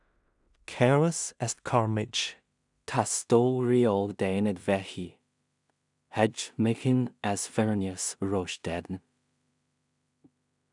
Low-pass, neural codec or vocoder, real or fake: 10.8 kHz; codec, 16 kHz in and 24 kHz out, 0.4 kbps, LongCat-Audio-Codec, two codebook decoder; fake